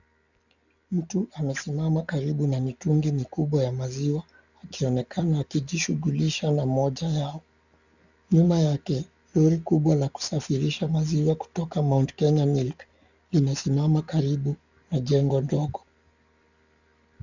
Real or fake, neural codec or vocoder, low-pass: real; none; 7.2 kHz